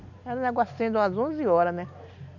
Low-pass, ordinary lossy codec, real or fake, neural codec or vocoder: 7.2 kHz; none; real; none